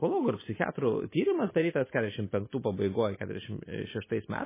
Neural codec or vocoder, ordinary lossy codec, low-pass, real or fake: none; MP3, 16 kbps; 3.6 kHz; real